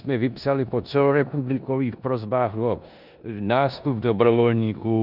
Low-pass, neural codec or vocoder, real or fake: 5.4 kHz; codec, 16 kHz in and 24 kHz out, 0.9 kbps, LongCat-Audio-Codec, four codebook decoder; fake